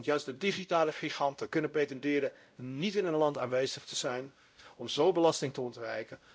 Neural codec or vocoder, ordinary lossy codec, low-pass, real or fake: codec, 16 kHz, 0.5 kbps, X-Codec, WavLM features, trained on Multilingual LibriSpeech; none; none; fake